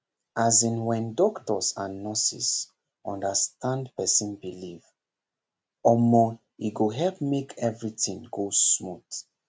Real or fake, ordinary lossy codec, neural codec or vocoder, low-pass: real; none; none; none